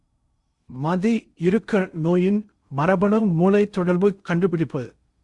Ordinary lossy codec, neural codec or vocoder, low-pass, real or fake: Opus, 64 kbps; codec, 16 kHz in and 24 kHz out, 0.6 kbps, FocalCodec, streaming, 2048 codes; 10.8 kHz; fake